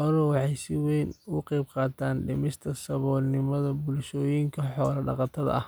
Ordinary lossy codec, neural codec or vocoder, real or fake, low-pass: none; none; real; none